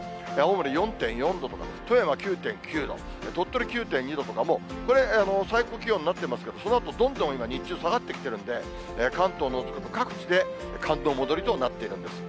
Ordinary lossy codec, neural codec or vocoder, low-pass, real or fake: none; none; none; real